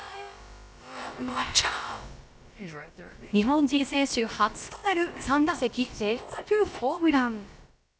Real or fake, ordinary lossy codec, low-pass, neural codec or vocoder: fake; none; none; codec, 16 kHz, about 1 kbps, DyCAST, with the encoder's durations